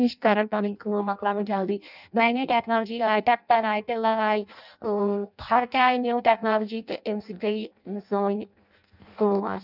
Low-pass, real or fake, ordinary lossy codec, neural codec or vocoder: 5.4 kHz; fake; none; codec, 16 kHz in and 24 kHz out, 0.6 kbps, FireRedTTS-2 codec